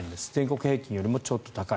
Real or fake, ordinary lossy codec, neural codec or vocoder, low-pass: real; none; none; none